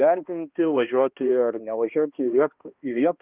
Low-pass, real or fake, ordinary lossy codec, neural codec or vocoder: 3.6 kHz; fake; Opus, 32 kbps; codec, 16 kHz, 1 kbps, X-Codec, HuBERT features, trained on balanced general audio